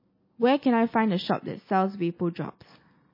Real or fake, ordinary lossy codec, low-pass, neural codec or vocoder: real; MP3, 24 kbps; 5.4 kHz; none